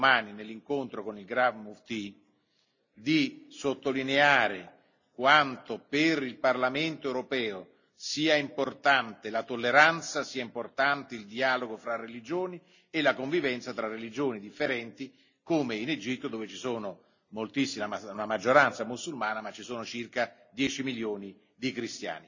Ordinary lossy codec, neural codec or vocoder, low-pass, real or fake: none; none; 7.2 kHz; real